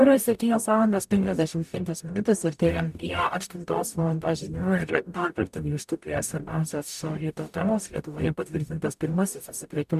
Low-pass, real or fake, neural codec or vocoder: 14.4 kHz; fake; codec, 44.1 kHz, 0.9 kbps, DAC